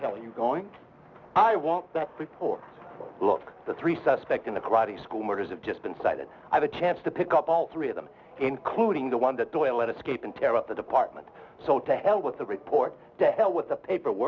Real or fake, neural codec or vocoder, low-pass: real; none; 7.2 kHz